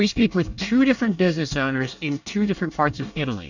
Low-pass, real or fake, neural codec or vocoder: 7.2 kHz; fake; codec, 24 kHz, 1 kbps, SNAC